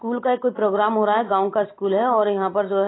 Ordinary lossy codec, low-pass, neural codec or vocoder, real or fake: AAC, 16 kbps; 7.2 kHz; none; real